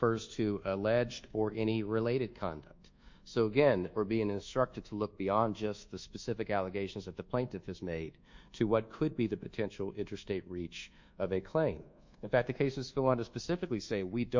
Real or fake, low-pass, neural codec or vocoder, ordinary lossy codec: fake; 7.2 kHz; codec, 24 kHz, 1.2 kbps, DualCodec; MP3, 64 kbps